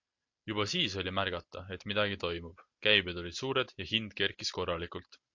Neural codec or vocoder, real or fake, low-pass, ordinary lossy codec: none; real; 7.2 kHz; MP3, 48 kbps